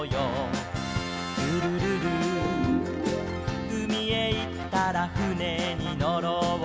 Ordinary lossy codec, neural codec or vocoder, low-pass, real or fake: none; none; none; real